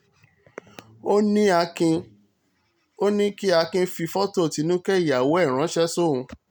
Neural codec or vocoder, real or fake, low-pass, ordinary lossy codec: none; real; none; none